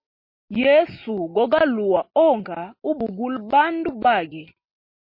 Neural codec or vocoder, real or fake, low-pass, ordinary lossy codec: none; real; 5.4 kHz; MP3, 32 kbps